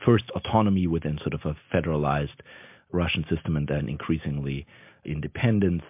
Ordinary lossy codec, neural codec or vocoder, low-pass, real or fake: MP3, 32 kbps; none; 3.6 kHz; real